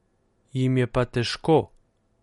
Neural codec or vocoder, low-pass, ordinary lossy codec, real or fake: none; 19.8 kHz; MP3, 48 kbps; real